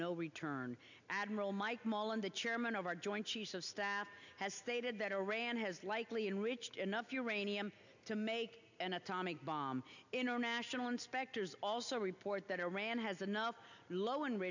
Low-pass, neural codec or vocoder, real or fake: 7.2 kHz; none; real